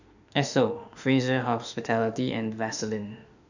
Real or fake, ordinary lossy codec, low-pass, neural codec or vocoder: fake; none; 7.2 kHz; autoencoder, 48 kHz, 32 numbers a frame, DAC-VAE, trained on Japanese speech